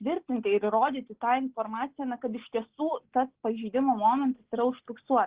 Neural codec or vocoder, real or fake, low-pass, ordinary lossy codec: none; real; 3.6 kHz; Opus, 16 kbps